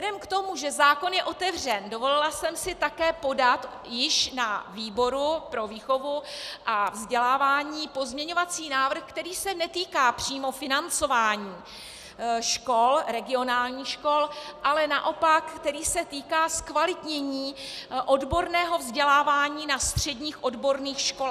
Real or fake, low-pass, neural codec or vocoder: real; 14.4 kHz; none